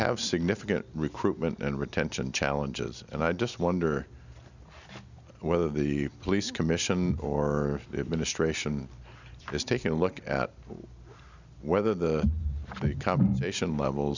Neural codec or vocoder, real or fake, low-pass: none; real; 7.2 kHz